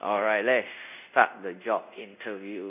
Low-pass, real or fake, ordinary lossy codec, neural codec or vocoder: 3.6 kHz; fake; none; codec, 24 kHz, 0.5 kbps, DualCodec